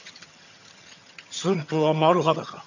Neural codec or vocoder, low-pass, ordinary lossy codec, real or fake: vocoder, 22.05 kHz, 80 mel bands, HiFi-GAN; 7.2 kHz; none; fake